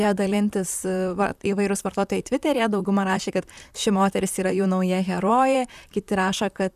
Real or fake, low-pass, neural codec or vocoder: fake; 14.4 kHz; vocoder, 44.1 kHz, 128 mel bands, Pupu-Vocoder